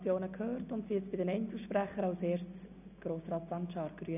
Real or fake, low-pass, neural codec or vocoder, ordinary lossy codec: real; 3.6 kHz; none; none